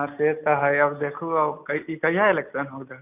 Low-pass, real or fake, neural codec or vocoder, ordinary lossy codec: 3.6 kHz; real; none; none